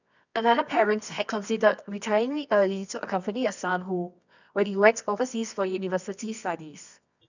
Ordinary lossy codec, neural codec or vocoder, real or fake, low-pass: none; codec, 24 kHz, 0.9 kbps, WavTokenizer, medium music audio release; fake; 7.2 kHz